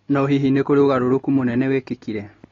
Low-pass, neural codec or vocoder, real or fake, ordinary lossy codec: 7.2 kHz; none; real; AAC, 32 kbps